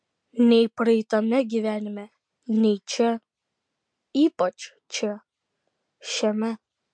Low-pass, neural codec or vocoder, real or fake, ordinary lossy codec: 9.9 kHz; none; real; MP3, 64 kbps